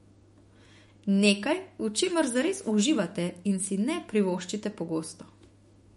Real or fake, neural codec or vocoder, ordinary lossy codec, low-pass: fake; autoencoder, 48 kHz, 128 numbers a frame, DAC-VAE, trained on Japanese speech; MP3, 48 kbps; 19.8 kHz